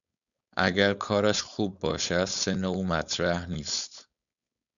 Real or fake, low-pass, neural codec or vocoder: fake; 7.2 kHz; codec, 16 kHz, 4.8 kbps, FACodec